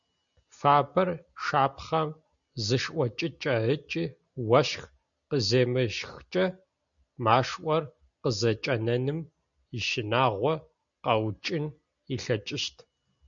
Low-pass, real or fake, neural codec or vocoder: 7.2 kHz; real; none